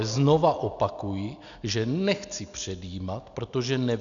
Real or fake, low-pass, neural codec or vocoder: real; 7.2 kHz; none